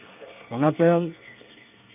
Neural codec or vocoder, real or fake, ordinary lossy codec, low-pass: codec, 24 kHz, 1 kbps, SNAC; fake; none; 3.6 kHz